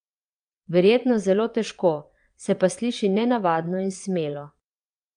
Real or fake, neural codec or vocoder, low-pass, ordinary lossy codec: fake; vocoder, 22.05 kHz, 80 mel bands, WaveNeXt; 9.9 kHz; Opus, 32 kbps